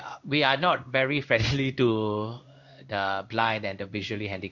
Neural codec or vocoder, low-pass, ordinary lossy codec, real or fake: codec, 16 kHz in and 24 kHz out, 1 kbps, XY-Tokenizer; 7.2 kHz; none; fake